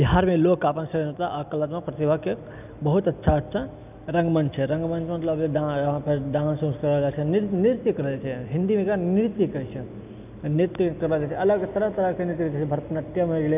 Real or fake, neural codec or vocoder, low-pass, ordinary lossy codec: real; none; 3.6 kHz; none